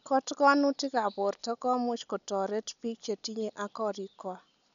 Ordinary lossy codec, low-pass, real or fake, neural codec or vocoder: none; 7.2 kHz; real; none